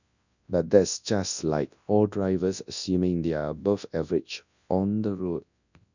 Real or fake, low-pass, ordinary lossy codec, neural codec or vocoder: fake; 7.2 kHz; none; codec, 24 kHz, 0.9 kbps, WavTokenizer, large speech release